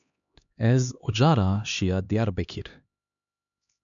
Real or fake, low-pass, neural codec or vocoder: fake; 7.2 kHz; codec, 16 kHz, 2 kbps, X-Codec, HuBERT features, trained on LibriSpeech